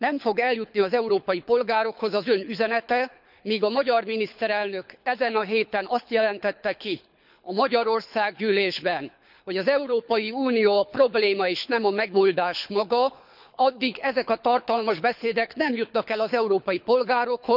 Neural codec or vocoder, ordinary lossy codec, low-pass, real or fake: codec, 24 kHz, 6 kbps, HILCodec; none; 5.4 kHz; fake